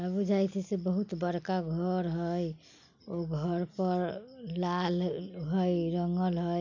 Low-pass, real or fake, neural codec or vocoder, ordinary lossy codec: 7.2 kHz; real; none; none